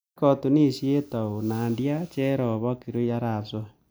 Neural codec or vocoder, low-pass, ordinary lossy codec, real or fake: none; none; none; real